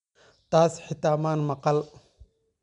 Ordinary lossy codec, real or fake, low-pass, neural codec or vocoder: MP3, 96 kbps; real; 10.8 kHz; none